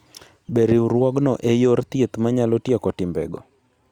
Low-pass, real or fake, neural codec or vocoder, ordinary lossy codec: 19.8 kHz; real; none; Opus, 64 kbps